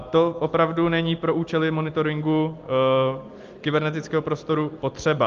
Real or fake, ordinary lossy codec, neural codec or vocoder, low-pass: real; Opus, 32 kbps; none; 7.2 kHz